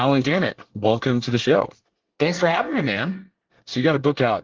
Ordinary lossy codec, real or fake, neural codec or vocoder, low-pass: Opus, 16 kbps; fake; codec, 24 kHz, 1 kbps, SNAC; 7.2 kHz